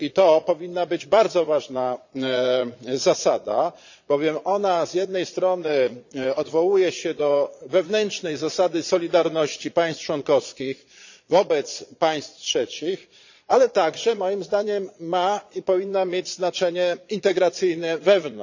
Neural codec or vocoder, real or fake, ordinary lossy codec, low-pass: vocoder, 22.05 kHz, 80 mel bands, Vocos; fake; none; 7.2 kHz